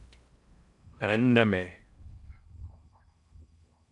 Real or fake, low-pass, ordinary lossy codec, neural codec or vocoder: fake; 10.8 kHz; MP3, 96 kbps; codec, 16 kHz in and 24 kHz out, 0.6 kbps, FocalCodec, streaming, 4096 codes